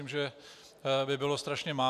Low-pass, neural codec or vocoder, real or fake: 14.4 kHz; none; real